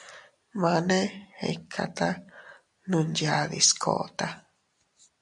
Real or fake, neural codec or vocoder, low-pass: real; none; 10.8 kHz